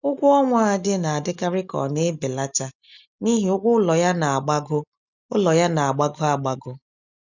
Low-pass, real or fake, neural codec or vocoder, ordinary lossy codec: 7.2 kHz; real; none; none